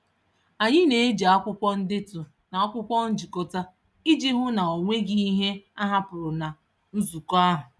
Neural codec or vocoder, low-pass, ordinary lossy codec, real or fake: none; none; none; real